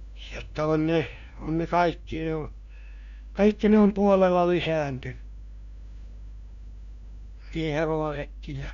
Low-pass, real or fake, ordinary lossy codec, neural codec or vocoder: 7.2 kHz; fake; none; codec, 16 kHz, 1 kbps, FunCodec, trained on LibriTTS, 50 frames a second